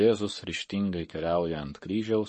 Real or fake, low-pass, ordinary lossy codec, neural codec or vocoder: fake; 10.8 kHz; MP3, 32 kbps; codec, 44.1 kHz, 7.8 kbps, Pupu-Codec